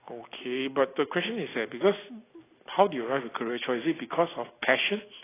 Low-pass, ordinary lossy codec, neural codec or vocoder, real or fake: 3.6 kHz; AAC, 16 kbps; none; real